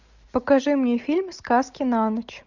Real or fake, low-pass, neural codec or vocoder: real; 7.2 kHz; none